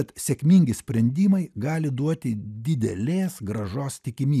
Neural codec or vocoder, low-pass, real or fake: none; 14.4 kHz; real